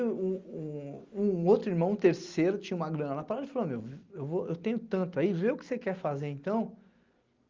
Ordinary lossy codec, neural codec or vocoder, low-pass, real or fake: Opus, 32 kbps; none; 7.2 kHz; real